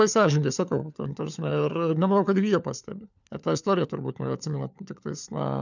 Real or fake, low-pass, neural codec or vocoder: fake; 7.2 kHz; codec, 16 kHz, 4 kbps, FunCodec, trained on LibriTTS, 50 frames a second